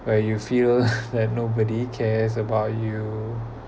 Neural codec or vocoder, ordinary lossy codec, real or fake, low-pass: none; none; real; none